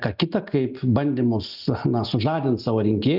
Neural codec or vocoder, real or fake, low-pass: none; real; 5.4 kHz